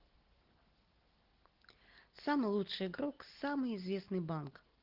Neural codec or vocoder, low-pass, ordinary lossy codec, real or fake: none; 5.4 kHz; Opus, 16 kbps; real